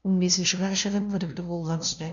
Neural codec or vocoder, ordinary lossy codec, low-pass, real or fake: codec, 16 kHz, 0.5 kbps, FunCodec, trained on LibriTTS, 25 frames a second; none; 7.2 kHz; fake